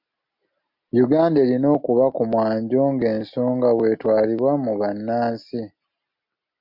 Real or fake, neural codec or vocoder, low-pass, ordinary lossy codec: real; none; 5.4 kHz; MP3, 48 kbps